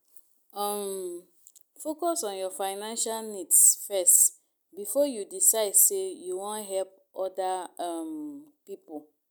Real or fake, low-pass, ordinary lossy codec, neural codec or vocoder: real; none; none; none